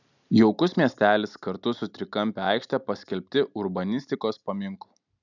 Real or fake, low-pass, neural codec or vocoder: real; 7.2 kHz; none